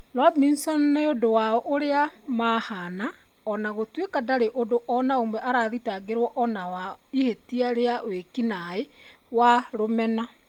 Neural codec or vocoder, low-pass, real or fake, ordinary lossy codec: none; 19.8 kHz; real; Opus, 32 kbps